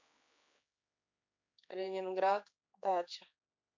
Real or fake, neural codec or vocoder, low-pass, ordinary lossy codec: fake; codec, 16 kHz, 4 kbps, X-Codec, HuBERT features, trained on general audio; 7.2 kHz; MP3, 48 kbps